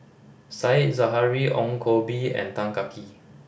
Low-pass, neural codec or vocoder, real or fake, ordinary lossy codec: none; none; real; none